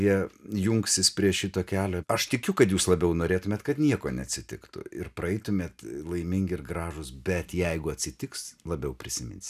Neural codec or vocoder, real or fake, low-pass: none; real; 14.4 kHz